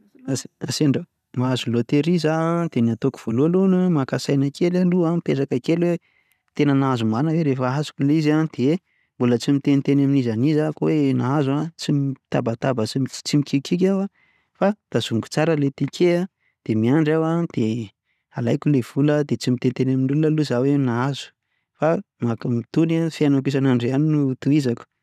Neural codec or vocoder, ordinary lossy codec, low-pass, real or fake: none; none; 14.4 kHz; real